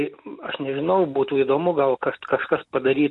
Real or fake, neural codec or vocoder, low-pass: real; none; 10.8 kHz